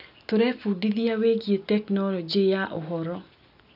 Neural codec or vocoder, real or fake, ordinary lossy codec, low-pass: none; real; none; 5.4 kHz